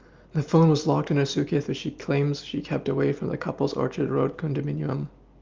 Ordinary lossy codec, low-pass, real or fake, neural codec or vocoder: Opus, 32 kbps; 7.2 kHz; real; none